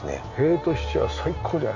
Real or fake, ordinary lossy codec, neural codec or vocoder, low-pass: real; none; none; 7.2 kHz